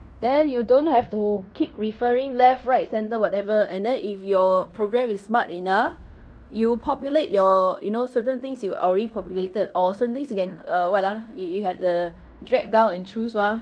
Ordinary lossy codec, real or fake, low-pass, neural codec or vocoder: none; fake; 9.9 kHz; codec, 16 kHz in and 24 kHz out, 0.9 kbps, LongCat-Audio-Codec, fine tuned four codebook decoder